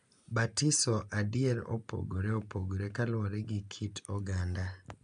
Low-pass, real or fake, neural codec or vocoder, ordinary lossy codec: 9.9 kHz; real; none; AAC, 96 kbps